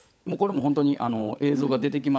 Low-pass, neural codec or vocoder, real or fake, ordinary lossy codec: none; codec, 16 kHz, 16 kbps, FunCodec, trained on LibriTTS, 50 frames a second; fake; none